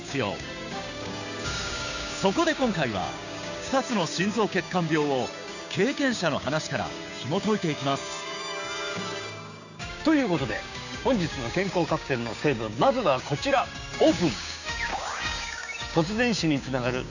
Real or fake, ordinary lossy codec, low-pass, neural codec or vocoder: fake; none; 7.2 kHz; codec, 16 kHz, 6 kbps, DAC